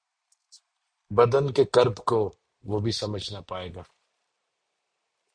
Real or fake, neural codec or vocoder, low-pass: real; none; 9.9 kHz